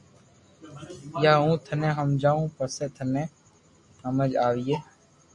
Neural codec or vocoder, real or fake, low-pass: none; real; 10.8 kHz